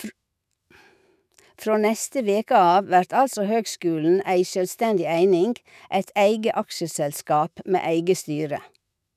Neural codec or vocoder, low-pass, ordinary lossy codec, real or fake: autoencoder, 48 kHz, 128 numbers a frame, DAC-VAE, trained on Japanese speech; 14.4 kHz; none; fake